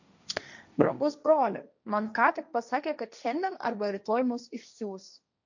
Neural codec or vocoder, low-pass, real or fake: codec, 16 kHz, 1.1 kbps, Voila-Tokenizer; 7.2 kHz; fake